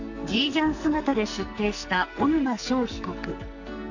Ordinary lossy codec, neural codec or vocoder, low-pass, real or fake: none; codec, 44.1 kHz, 2.6 kbps, SNAC; 7.2 kHz; fake